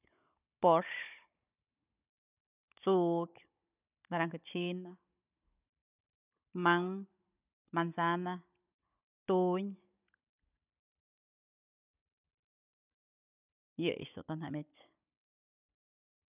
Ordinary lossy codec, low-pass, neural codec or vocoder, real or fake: none; 3.6 kHz; codec, 16 kHz, 16 kbps, FunCodec, trained on Chinese and English, 50 frames a second; fake